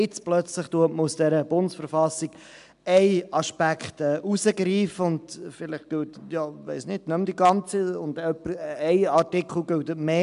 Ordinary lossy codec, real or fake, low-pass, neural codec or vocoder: AAC, 96 kbps; real; 10.8 kHz; none